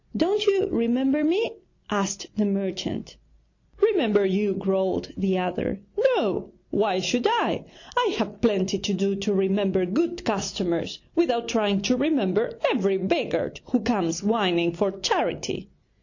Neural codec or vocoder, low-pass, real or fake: none; 7.2 kHz; real